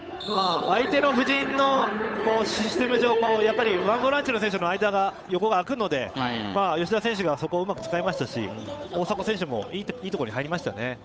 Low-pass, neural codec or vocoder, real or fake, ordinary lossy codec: none; codec, 16 kHz, 8 kbps, FunCodec, trained on Chinese and English, 25 frames a second; fake; none